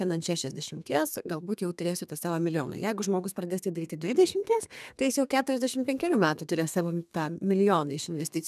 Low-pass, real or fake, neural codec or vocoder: 14.4 kHz; fake; codec, 32 kHz, 1.9 kbps, SNAC